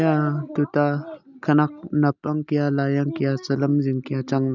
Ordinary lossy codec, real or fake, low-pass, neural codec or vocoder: none; real; 7.2 kHz; none